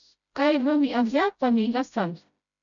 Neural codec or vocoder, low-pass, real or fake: codec, 16 kHz, 0.5 kbps, FreqCodec, smaller model; 7.2 kHz; fake